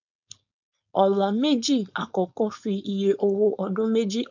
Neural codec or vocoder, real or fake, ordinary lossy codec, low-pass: codec, 16 kHz, 4.8 kbps, FACodec; fake; none; 7.2 kHz